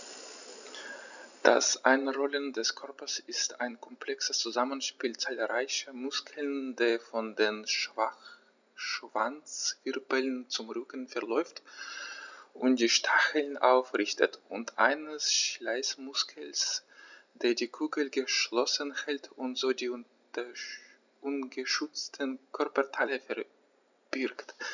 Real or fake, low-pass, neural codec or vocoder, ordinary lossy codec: real; 7.2 kHz; none; none